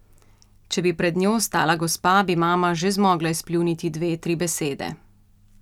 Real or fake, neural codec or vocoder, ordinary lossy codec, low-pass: real; none; none; 19.8 kHz